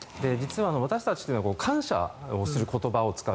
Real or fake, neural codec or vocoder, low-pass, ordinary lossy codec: real; none; none; none